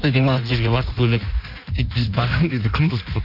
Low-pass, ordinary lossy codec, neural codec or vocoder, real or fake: 5.4 kHz; none; codec, 16 kHz in and 24 kHz out, 1.1 kbps, FireRedTTS-2 codec; fake